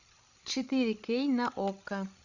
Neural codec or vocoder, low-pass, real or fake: codec, 16 kHz, 16 kbps, FreqCodec, larger model; 7.2 kHz; fake